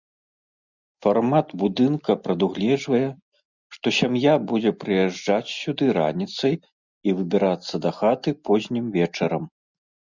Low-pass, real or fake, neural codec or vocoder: 7.2 kHz; real; none